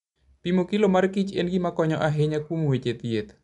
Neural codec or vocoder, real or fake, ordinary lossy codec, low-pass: none; real; none; 10.8 kHz